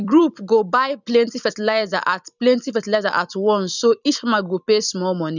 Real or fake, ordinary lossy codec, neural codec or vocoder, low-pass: fake; none; vocoder, 44.1 kHz, 128 mel bands every 256 samples, BigVGAN v2; 7.2 kHz